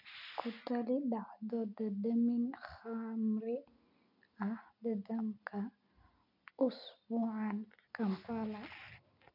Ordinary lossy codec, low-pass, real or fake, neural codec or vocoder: none; 5.4 kHz; real; none